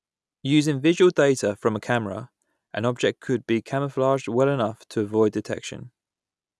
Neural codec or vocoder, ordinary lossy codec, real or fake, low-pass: none; none; real; none